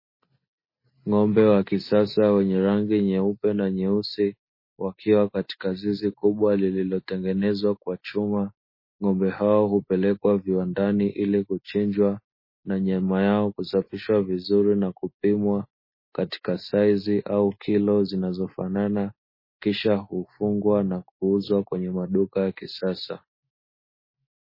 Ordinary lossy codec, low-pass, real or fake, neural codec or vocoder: MP3, 24 kbps; 5.4 kHz; real; none